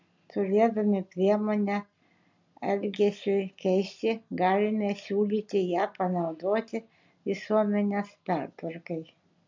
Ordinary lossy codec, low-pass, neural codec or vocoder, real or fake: MP3, 64 kbps; 7.2 kHz; none; real